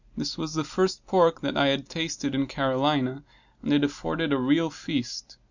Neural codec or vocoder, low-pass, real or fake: none; 7.2 kHz; real